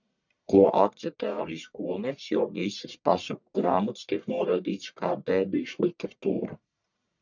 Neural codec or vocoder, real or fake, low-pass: codec, 44.1 kHz, 1.7 kbps, Pupu-Codec; fake; 7.2 kHz